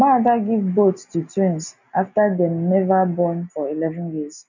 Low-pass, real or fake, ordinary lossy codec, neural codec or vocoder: 7.2 kHz; real; none; none